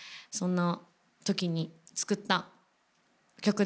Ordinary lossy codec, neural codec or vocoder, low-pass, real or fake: none; none; none; real